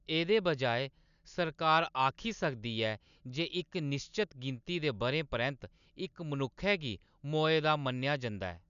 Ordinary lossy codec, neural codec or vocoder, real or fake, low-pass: none; none; real; 7.2 kHz